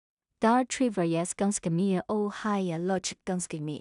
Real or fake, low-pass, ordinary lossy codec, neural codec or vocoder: fake; 10.8 kHz; none; codec, 16 kHz in and 24 kHz out, 0.4 kbps, LongCat-Audio-Codec, two codebook decoder